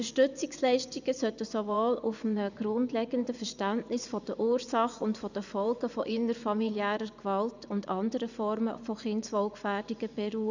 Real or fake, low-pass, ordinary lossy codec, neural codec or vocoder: fake; 7.2 kHz; none; vocoder, 24 kHz, 100 mel bands, Vocos